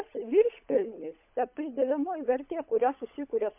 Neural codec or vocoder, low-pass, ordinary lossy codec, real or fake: codec, 16 kHz, 16 kbps, FunCodec, trained on LibriTTS, 50 frames a second; 3.6 kHz; MP3, 32 kbps; fake